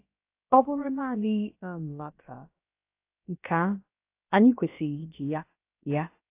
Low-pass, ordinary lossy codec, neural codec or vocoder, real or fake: 3.6 kHz; AAC, 24 kbps; codec, 16 kHz, about 1 kbps, DyCAST, with the encoder's durations; fake